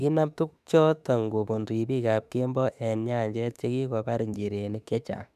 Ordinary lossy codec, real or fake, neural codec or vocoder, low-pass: none; fake; autoencoder, 48 kHz, 32 numbers a frame, DAC-VAE, trained on Japanese speech; 14.4 kHz